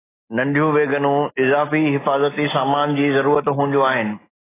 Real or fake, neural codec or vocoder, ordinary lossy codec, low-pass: real; none; AAC, 16 kbps; 3.6 kHz